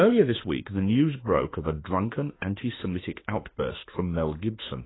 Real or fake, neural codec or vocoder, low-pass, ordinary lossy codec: fake; autoencoder, 48 kHz, 32 numbers a frame, DAC-VAE, trained on Japanese speech; 7.2 kHz; AAC, 16 kbps